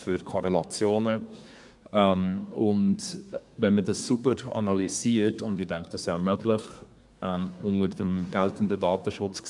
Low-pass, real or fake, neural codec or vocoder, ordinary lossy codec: 10.8 kHz; fake; codec, 24 kHz, 1 kbps, SNAC; none